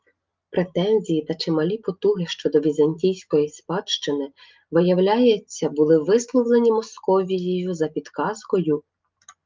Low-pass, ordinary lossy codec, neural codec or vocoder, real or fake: 7.2 kHz; Opus, 24 kbps; none; real